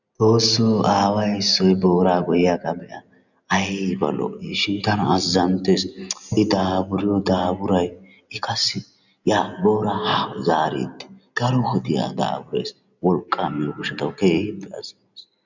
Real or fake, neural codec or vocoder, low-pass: real; none; 7.2 kHz